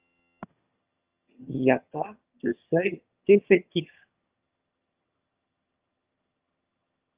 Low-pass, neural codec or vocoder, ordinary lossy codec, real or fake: 3.6 kHz; vocoder, 22.05 kHz, 80 mel bands, HiFi-GAN; Opus, 24 kbps; fake